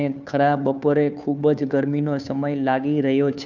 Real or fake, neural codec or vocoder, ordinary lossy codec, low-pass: fake; codec, 16 kHz, 2 kbps, FunCodec, trained on Chinese and English, 25 frames a second; none; 7.2 kHz